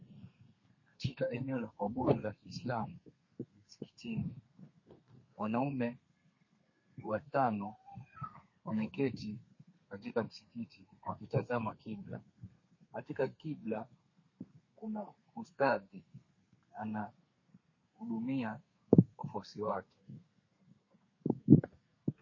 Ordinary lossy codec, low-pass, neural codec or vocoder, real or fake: MP3, 32 kbps; 7.2 kHz; codec, 32 kHz, 1.9 kbps, SNAC; fake